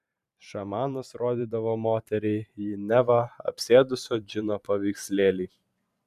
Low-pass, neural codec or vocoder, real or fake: 14.4 kHz; vocoder, 44.1 kHz, 128 mel bands every 512 samples, BigVGAN v2; fake